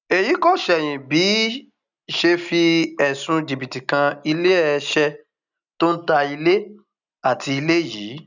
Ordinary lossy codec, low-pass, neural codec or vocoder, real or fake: none; 7.2 kHz; none; real